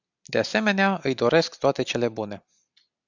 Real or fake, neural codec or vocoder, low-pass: real; none; 7.2 kHz